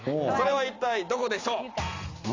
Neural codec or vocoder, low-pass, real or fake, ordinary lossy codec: none; 7.2 kHz; real; AAC, 32 kbps